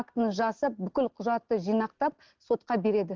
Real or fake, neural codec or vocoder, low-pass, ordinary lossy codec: real; none; 7.2 kHz; Opus, 16 kbps